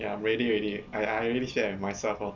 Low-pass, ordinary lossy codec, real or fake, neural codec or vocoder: 7.2 kHz; none; real; none